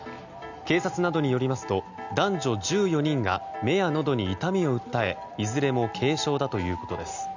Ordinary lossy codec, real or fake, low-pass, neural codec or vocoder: none; real; 7.2 kHz; none